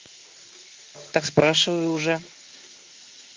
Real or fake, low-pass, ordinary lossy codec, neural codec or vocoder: fake; 7.2 kHz; Opus, 32 kbps; codec, 16 kHz in and 24 kHz out, 1 kbps, XY-Tokenizer